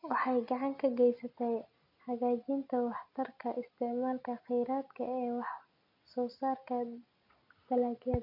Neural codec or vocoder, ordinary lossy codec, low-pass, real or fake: none; none; 5.4 kHz; real